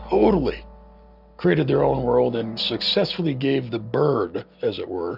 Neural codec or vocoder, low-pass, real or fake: none; 5.4 kHz; real